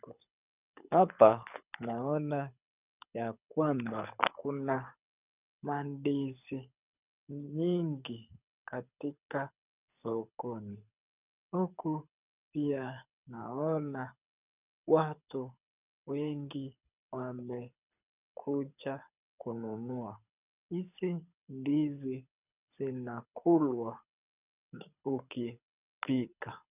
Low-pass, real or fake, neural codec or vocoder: 3.6 kHz; fake; codec, 24 kHz, 6 kbps, HILCodec